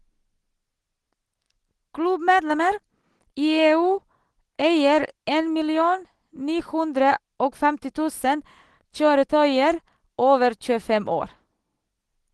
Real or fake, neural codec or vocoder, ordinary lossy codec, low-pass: real; none; Opus, 16 kbps; 10.8 kHz